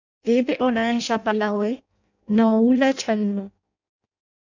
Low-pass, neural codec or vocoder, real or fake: 7.2 kHz; codec, 16 kHz in and 24 kHz out, 0.6 kbps, FireRedTTS-2 codec; fake